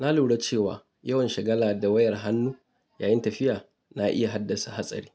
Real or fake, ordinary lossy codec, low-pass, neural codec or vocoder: real; none; none; none